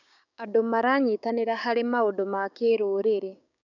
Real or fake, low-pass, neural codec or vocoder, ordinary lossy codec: fake; 7.2 kHz; codec, 16 kHz, 6 kbps, DAC; none